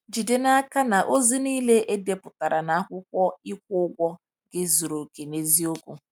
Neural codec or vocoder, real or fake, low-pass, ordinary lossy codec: none; real; 19.8 kHz; none